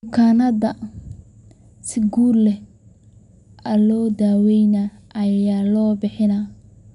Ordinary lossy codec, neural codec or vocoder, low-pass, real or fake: none; none; 10.8 kHz; real